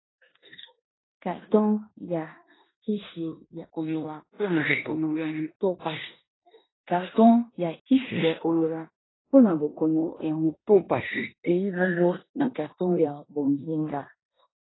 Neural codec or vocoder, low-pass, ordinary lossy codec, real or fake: codec, 16 kHz in and 24 kHz out, 0.9 kbps, LongCat-Audio-Codec, four codebook decoder; 7.2 kHz; AAC, 16 kbps; fake